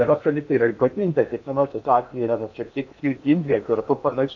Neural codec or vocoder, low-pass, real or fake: codec, 16 kHz in and 24 kHz out, 0.8 kbps, FocalCodec, streaming, 65536 codes; 7.2 kHz; fake